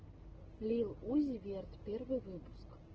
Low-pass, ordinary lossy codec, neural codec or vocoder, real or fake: 7.2 kHz; Opus, 16 kbps; none; real